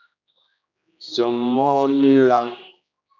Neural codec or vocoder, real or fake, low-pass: codec, 16 kHz, 1 kbps, X-Codec, HuBERT features, trained on general audio; fake; 7.2 kHz